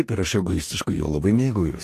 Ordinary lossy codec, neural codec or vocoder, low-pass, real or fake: AAC, 64 kbps; codec, 44.1 kHz, 2.6 kbps, DAC; 14.4 kHz; fake